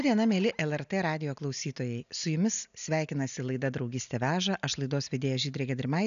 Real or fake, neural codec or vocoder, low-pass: real; none; 7.2 kHz